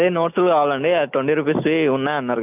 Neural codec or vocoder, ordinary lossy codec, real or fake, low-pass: none; none; real; 3.6 kHz